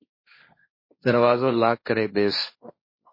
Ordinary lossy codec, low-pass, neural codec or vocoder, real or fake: MP3, 24 kbps; 5.4 kHz; codec, 16 kHz, 1.1 kbps, Voila-Tokenizer; fake